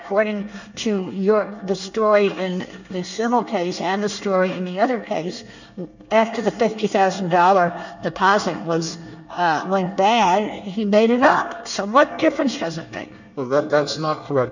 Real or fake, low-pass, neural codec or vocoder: fake; 7.2 kHz; codec, 24 kHz, 1 kbps, SNAC